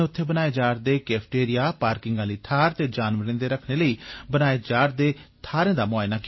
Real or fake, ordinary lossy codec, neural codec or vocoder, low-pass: real; MP3, 24 kbps; none; 7.2 kHz